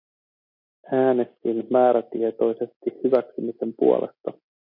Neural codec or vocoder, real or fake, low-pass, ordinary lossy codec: none; real; 5.4 kHz; MP3, 48 kbps